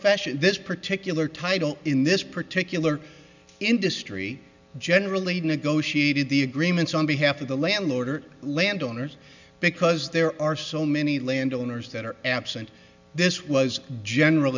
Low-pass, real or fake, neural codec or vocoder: 7.2 kHz; real; none